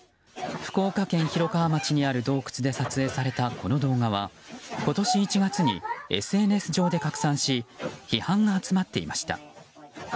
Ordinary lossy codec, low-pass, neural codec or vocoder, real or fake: none; none; none; real